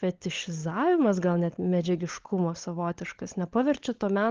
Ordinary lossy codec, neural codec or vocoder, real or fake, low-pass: Opus, 16 kbps; codec, 16 kHz, 16 kbps, FunCodec, trained on LibriTTS, 50 frames a second; fake; 7.2 kHz